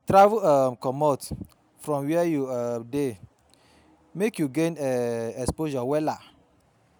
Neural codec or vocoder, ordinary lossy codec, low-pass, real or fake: none; none; none; real